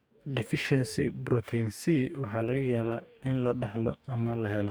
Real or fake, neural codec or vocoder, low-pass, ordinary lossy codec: fake; codec, 44.1 kHz, 2.6 kbps, DAC; none; none